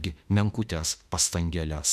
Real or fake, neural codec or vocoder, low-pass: fake; autoencoder, 48 kHz, 32 numbers a frame, DAC-VAE, trained on Japanese speech; 14.4 kHz